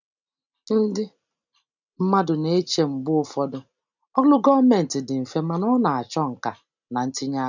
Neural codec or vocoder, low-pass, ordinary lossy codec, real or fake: none; 7.2 kHz; none; real